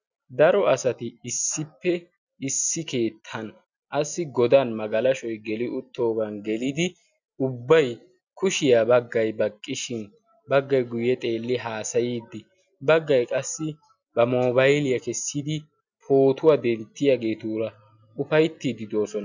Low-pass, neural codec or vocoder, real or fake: 7.2 kHz; none; real